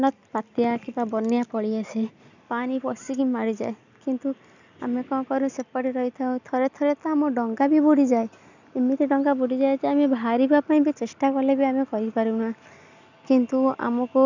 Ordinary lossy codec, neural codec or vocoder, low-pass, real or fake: none; none; 7.2 kHz; real